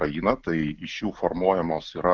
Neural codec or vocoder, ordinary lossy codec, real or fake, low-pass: none; Opus, 32 kbps; real; 7.2 kHz